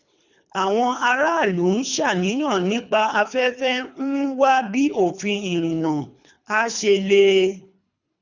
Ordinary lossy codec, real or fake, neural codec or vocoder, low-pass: none; fake; codec, 24 kHz, 3 kbps, HILCodec; 7.2 kHz